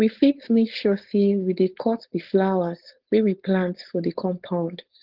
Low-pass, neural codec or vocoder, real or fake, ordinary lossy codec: 5.4 kHz; codec, 16 kHz, 4.8 kbps, FACodec; fake; Opus, 16 kbps